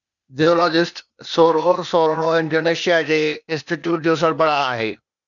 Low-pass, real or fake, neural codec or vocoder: 7.2 kHz; fake; codec, 16 kHz, 0.8 kbps, ZipCodec